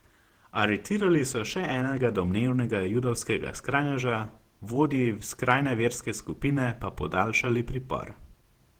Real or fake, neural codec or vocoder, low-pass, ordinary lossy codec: fake; vocoder, 48 kHz, 128 mel bands, Vocos; 19.8 kHz; Opus, 16 kbps